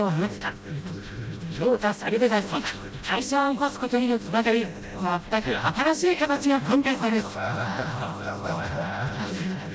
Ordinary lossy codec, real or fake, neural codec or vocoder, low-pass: none; fake; codec, 16 kHz, 0.5 kbps, FreqCodec, smaller model; none